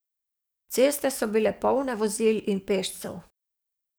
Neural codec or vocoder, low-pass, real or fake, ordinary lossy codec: codec, 44.1 kHz, 7.8 kbps, DAC; none; fake; none